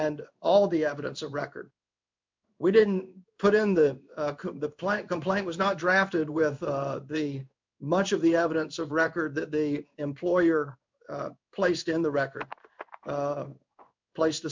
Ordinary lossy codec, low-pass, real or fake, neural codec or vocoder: MP3, 64 kbps; 7.2 kHz; fake; codec, 16 kHz in and 24 kHz out, 1 kbps, XY-Tokenizer